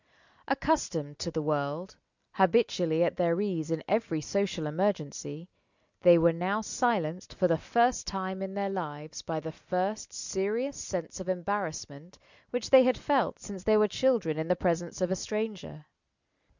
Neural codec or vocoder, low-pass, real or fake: none; 7.2 kHz; real